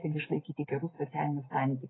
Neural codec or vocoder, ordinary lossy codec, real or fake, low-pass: none; AAC, 16 kbps; real; 7.2 kHz